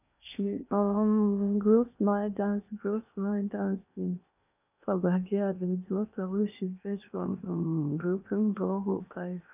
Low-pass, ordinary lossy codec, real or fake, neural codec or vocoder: 3.6 kHz; none; fake; codec, 16 kHz in and 24 kHz out, 0.8 kbps, FocalCodec, streaming, 65536 codes